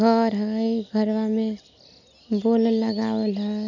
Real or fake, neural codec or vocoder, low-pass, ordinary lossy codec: real; none; 7.2 kHz; none